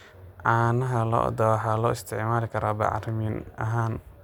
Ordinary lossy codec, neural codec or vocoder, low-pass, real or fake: none; none; 19.8 kHz; real